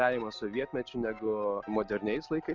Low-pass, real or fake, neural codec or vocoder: 7.2 kHz; real; none